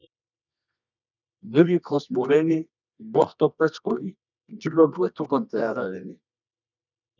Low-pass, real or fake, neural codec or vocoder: 7.2 kHz; fake; codec, 24 kHz, 0.9 kbps, WavTokenizer, medium music audio release